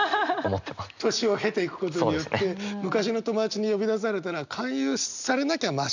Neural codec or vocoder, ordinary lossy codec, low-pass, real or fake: none; none; 7.2 kHz; real